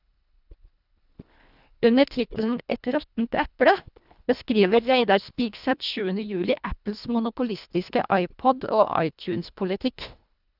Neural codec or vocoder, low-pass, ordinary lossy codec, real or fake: codec, 24 kHz, 1.5 kbps, HILCodec; 5.4 kHz; none; fake